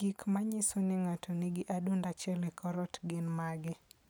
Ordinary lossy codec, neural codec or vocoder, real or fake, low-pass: none; none; real; none